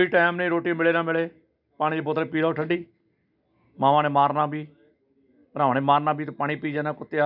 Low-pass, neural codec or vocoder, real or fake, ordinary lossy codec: 5.4 kHz; none; real; none